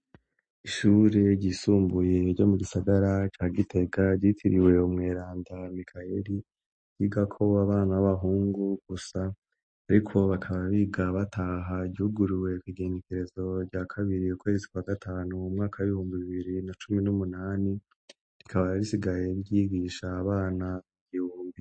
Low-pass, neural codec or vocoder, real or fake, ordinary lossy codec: 9.9 kHz; none; real; MP3, 32 kbps